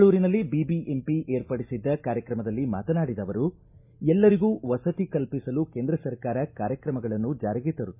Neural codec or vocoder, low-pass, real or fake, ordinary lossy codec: none; 3.6 kHz; real; MP3, 32 kbps